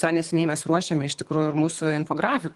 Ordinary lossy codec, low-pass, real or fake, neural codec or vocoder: Opus, 24 kbps; 10.8 kHz; fake; codec, 24 kHz, 3 kbps, HILCodec